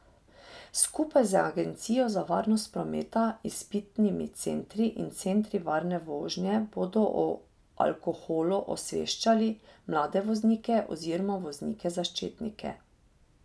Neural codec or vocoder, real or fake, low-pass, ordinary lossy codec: none; real; none; none